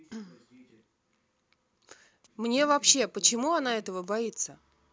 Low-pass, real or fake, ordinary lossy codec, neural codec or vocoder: none; real; none; none